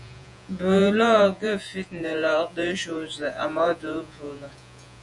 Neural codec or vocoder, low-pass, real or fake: vocoder, 48 kHz, 128 mel bands, Vocos; 10.8 kHz; fake